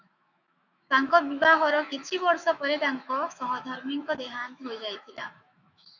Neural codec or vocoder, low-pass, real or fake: autoencoder, 48 kHz, 128 numbers a frame, DAC-VAE, trained on Japanese speech; 7.2 kHz; fake